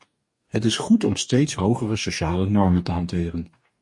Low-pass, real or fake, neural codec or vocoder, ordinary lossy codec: 10.8 kHz; fake; codec, 44.1 kHz, 2.6 kbps, DAC; MP3, 48 kbps